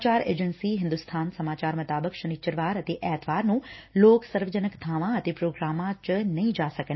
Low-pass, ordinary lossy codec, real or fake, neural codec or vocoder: 7.2 kHz; MP3, 24 kbps; real; none